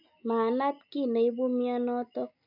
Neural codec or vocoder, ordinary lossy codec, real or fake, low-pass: none; none; real; 5.4 kHz